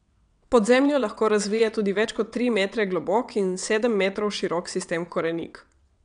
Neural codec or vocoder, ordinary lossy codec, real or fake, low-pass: vocoder, 22.05 kHz, 80 mel bands, WaveNeXt; none; fake; 9.9 kHz